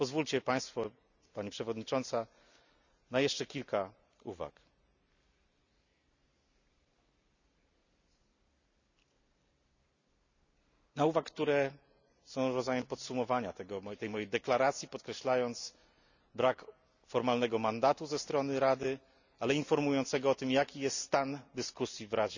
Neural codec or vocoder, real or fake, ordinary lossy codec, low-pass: none; real; none; 7.2 kHz